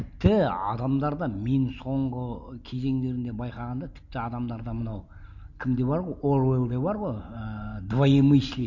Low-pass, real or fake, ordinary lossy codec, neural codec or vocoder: 7.2 kHz; real; none; none